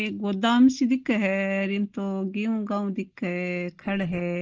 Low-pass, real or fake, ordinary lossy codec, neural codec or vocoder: 7.2 kHz; real; Opus, 16 kbps; none